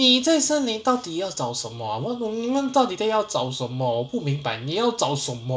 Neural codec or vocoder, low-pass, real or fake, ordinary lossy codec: none; none; real; none